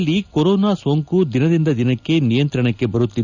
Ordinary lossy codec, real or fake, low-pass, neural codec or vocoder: none; real; 7.2 kHz; none